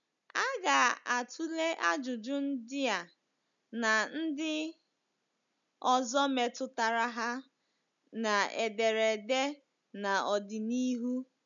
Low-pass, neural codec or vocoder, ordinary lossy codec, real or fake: 7.2 kHz; none; none; real